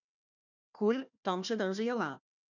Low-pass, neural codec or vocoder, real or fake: 7.2 kHz; codec, 16 kHz, 1 kbps, FunCodec, trained on Chinese and English, 50 frames a second; fake